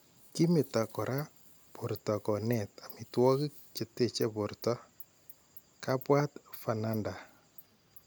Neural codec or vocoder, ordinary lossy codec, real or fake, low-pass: none; none; real; none